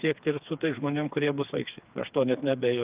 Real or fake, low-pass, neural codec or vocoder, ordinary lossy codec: fake; 3.6 kHz; codec, 16 kHz, 4 kbps, FreqCodec, smaller model; Opus, 24 kbps